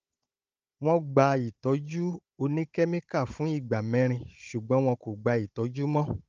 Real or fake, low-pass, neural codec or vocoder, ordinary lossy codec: fake; 7.2 kHz; codec, 16 kHz, 16 kbps, FunCodec, trained on Chinese and English, 50 frames a second; Opus, 24 kbps